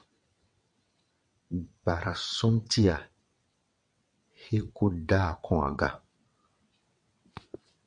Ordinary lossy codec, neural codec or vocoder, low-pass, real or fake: MP3, 48 kbps; vocoder, 22.05 kHz, 80 mel bands, WaveNeXt; 9.9 kHz; fake